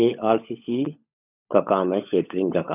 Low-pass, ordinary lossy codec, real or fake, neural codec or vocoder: 3.6 kHz; none; fake; codec, 16 kHz, 16 kbps, FunCodec, trained on LibriTTS, 50 frames a second